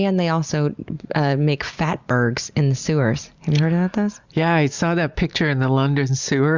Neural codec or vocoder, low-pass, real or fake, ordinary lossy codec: none; 7.2 kHz; real; Opus, 64 kbps